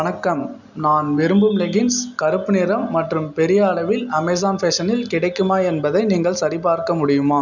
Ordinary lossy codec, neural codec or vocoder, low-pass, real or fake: none; none; 7.2 kHz; real